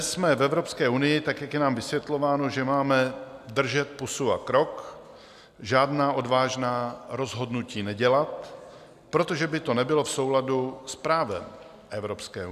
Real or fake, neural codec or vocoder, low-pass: real; none; 14.4 kHz